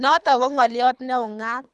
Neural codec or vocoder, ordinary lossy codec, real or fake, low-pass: codec, 24 kHz, 3 kbps, HILCodec; none; fake; none